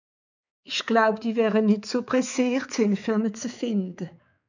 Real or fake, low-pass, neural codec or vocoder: fake; 7.2 kHz; codec, 16 kHz, 4 kbps, X-Codec, HuBERT features, trained on balanced general audio